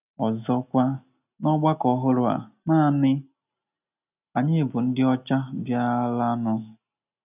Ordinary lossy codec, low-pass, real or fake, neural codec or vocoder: none; 3.6 kHz; real; none